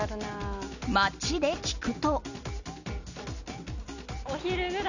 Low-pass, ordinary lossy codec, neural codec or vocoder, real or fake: 7.2 kHz; none; none; real